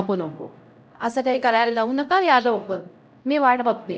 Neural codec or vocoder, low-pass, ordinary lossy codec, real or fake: codec, 16 kHz, 0.5 kbps, X-Codec, HuBERT features, trained on LibriSpeech; none; none; fake